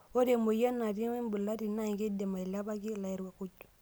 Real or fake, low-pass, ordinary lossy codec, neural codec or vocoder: real; none; none; none